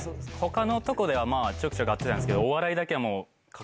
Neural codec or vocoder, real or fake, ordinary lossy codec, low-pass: none; real; none; none